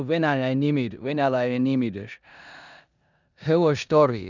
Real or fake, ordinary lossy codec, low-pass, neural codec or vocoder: fake; none; 7.2 kHz; codec, 16 kHz in and 24 kHz out, 0.9 kbps, LongCat-Audio-Codec, four codebook decoder